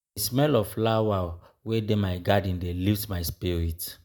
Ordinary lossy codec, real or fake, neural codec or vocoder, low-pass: none; real; none; none